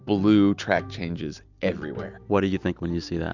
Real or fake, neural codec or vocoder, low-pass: real; none; 7.2 kHz